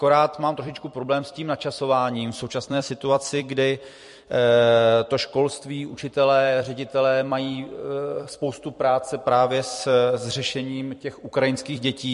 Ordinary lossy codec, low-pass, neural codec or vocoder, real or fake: MP3, 48 kbps; 14.4 kHz; vocoder, 44.1 kHz, 128 mel bands every 256 samples, BigVGAN v2; fake